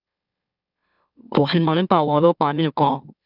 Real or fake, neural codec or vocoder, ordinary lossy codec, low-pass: fake; autoencoder, 44.1 kHz, a latent of 192 numbers a frame, MeloTTS; none; 5.4 kHz